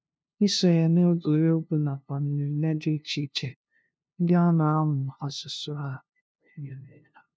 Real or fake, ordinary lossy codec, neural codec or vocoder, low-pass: fake; none; codec, 16 kHz, 0.5 kbps, FunCodec, trained on LibriTTS, 25 frames a second; none